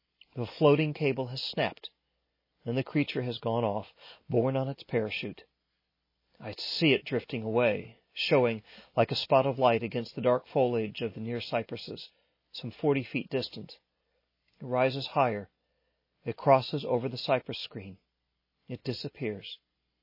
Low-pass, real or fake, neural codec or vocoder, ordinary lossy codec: 5.4 kHz; real; none; MP3, 24 kbps